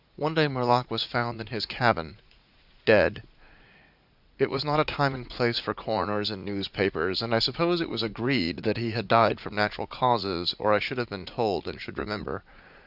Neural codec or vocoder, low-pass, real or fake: vocoder, 44.1 kHz, 80 mel bands, Vocos; 5.4 kHz; fake